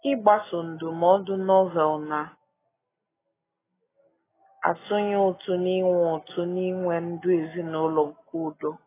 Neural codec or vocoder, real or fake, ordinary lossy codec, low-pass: none; real; AAC, 16 kbps; 3.6 kHz